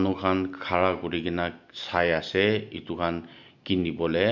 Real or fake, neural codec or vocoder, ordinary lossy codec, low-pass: real; none; MP3, 64 kbps; 7.2 kHz